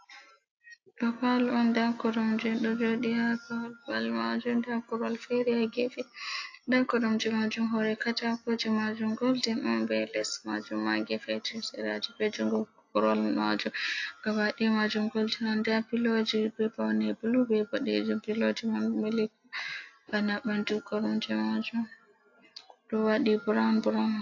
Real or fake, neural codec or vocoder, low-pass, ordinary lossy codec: real; none; 7.2 kHz; AAC, 48 kbps